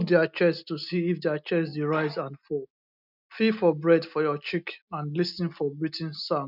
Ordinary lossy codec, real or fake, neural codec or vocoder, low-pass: none; real; none; 5.4 kHz